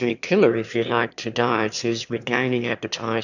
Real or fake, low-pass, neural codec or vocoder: fake; 7.2 kHz; autoencoder, 22.05 kHz, a latent of 192 numbers a frame, VITS, trained on one speaker